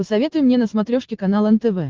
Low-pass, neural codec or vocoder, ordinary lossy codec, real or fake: 7.2 kHz; none; Opus, 16 kbps; real